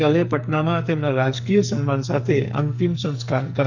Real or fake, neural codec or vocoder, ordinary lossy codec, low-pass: fake; codec, 44.1 kHz, 2.6 kbps, SNAC; none; 7.2 kHz